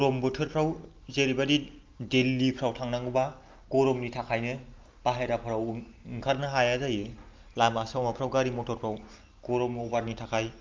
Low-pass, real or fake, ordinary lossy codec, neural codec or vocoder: 7.2 kHz; real; Opus, 24 kbps; none